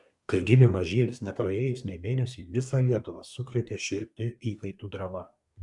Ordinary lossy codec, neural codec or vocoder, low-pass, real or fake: MP3, 96 kbps; codec, 24 kHz, 1 kbps, SNAC; 10.8 kHz; fake